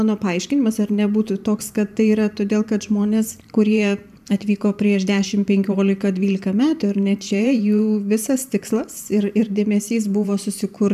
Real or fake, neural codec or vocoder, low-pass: fake; vocoder, 44.1 kHz, 128 mel bands every 512 samples, BigVGAN v2; 14.4 kHz